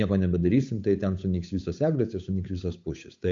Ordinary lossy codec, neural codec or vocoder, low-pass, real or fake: MP3, 48 kbps; codec, 16 kHz, 8 kbps, FunCodec, trained on Chinese and English, 25 frames a second; 7.2 kHz; fake